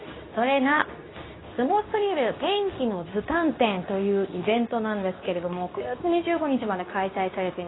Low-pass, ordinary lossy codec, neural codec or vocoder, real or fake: 7.2 kHz; AAC, 16 kbps; codec, 24 kHz, 0.9 kbps, WavTokenizer, medium speech release version 2; fake